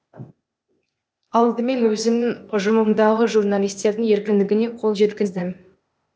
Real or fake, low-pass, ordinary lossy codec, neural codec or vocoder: fake; none; none; codec, 16 kHz, 0.8 kbps, ZipCodec